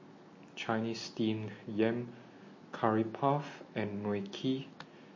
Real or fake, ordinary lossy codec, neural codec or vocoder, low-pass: real; MP3, 32 kbps; none; 7.2 kHz